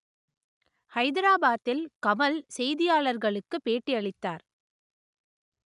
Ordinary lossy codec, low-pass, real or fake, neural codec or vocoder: none; 10.8 kHz; real; none